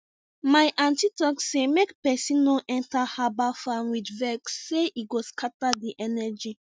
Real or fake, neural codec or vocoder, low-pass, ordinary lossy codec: real; none; none; none